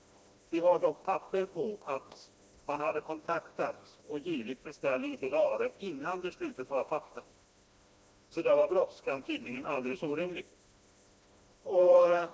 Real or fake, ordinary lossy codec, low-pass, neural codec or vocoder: fake; none; none; codec, 16 kHz, 1 kbps, FreqCodec, smaller model